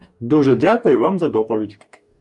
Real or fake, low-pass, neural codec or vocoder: fake; 10.8 kHz; codec, 44.1 kHz, 2.6 kbps, DAC